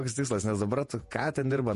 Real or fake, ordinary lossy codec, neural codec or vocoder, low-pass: fake; MP3, 48 kbps; vocoder, 48 kHz, 128 mel bands, Vocos; 14.4 kHz